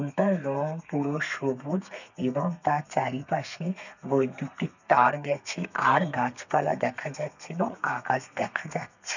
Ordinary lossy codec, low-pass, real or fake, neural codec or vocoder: none; 7.2 kHz; fake; codec, 32 kHz, 1.9 kbps, SNAC